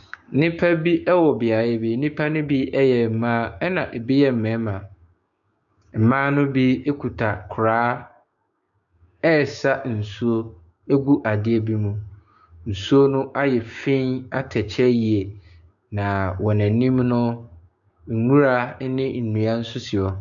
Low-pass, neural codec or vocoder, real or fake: 7.2 kHz; codec, 16 kHz, 6 kbps, DAC; fake